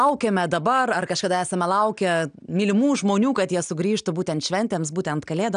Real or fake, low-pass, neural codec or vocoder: real; 9.9 kHz; none